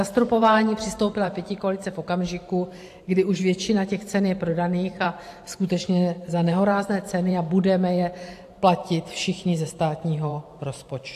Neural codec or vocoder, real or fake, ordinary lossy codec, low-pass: vocoder, 44.1 kHz, 128 mel bands every 512 samples, BigVGAN v2; fake; AAC, 64 kbps; 14.4 kHz